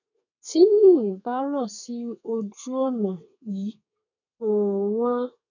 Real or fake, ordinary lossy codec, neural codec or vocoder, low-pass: fake; none; codec, 32 kHz, 1.9 kbps, SNAC; 7.2 kHz